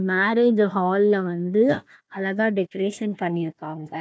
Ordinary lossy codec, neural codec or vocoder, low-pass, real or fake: none; codec, 16 kHz, 1 kbps, FunCodec, trained on Chinese and English, 50 frames a second; none; fake